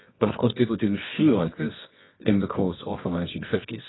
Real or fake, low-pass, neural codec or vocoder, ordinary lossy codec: fake; 7.2 kHz; codec, 24 kHz, 0.9 kbps, WavTokenizer, medium music audio release; AAC, 16 kbps